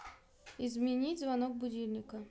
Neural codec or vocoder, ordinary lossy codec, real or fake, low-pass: none; none; real; none